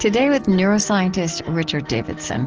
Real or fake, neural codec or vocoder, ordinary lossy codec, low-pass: real; none; Opus, 16 kbps; 7.2 kHz